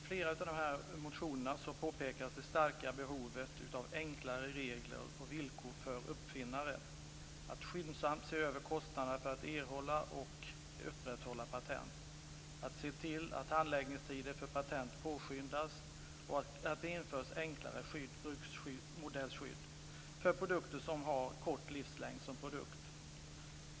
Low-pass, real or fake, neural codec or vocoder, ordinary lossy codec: none; real; none; none